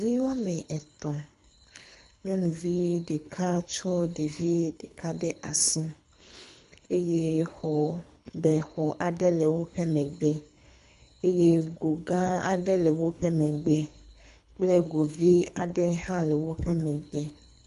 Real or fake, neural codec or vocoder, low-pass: fake; codec, 24 kHz, 3 kbps, HILCodec; 10.8 kHz